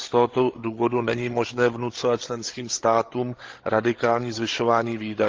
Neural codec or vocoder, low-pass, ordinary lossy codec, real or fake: codec, 16 kHz, 16 kbps, FreqCodec, larger model; 7.2 kHz; Opus, 16 kbps; fake